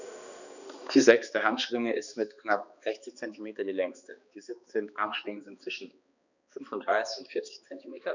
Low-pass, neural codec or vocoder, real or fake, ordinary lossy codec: 7.2 kHz; codec, 16 kHz, 2 kbps, X-Codec, HuBERT features, trained on balanced general audio; fake; none